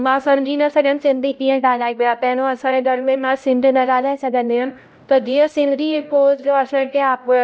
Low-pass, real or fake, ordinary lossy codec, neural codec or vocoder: none; fake; none; codec, 16 kHz, 0.5 kbps, X-Codec, HuBERT features, trained on LibriSpeech